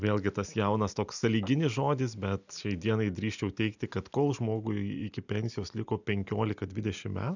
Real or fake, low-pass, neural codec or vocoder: real; 7.2 kHz; none